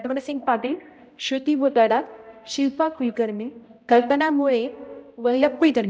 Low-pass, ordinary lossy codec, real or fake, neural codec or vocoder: none; none; fake; codec, 16 kHz, 0.5 kbps, X-Codec, HuBERT features, trained on balanced general audio